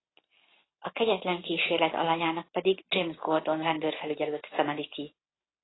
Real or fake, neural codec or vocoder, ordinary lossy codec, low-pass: real; none; AAC, 16 kbps; 7.2 kHz